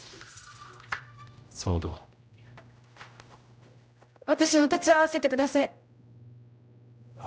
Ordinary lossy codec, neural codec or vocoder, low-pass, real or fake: none; codec, 16 kHz, 0.5 kbps, X-Codec, HuBERT features, trained on general audio; none; fake